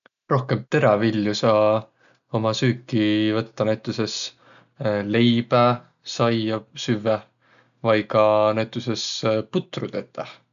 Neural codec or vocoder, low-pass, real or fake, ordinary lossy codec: none; 7.2 kHz; real; none